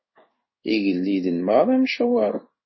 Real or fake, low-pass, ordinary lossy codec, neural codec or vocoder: fake; 7.2 kHz; MP3, 24 kbps; codec, 16 kHz in and 24 kHz out, 1 kbps, XY-Tokenizer